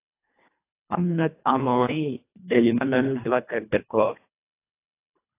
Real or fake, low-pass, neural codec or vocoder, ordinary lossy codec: fake; 3.6 kHz; codec, 24 kHz, 1.5 kbps, HILCodec; AAC, 24 kbps